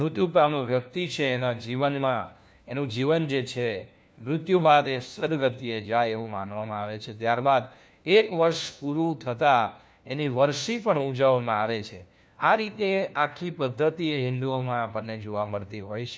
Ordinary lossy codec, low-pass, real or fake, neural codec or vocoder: none; none; fake; codec, 16 kHz, 1 kbps, FunCodec, trained on LibriTTS, 50 frames a second